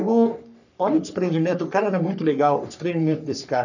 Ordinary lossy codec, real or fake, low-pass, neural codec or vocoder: none; fake; 7.2 kHz; codec, 44.1 kHz, 3.4 kbps, Pupu-Codec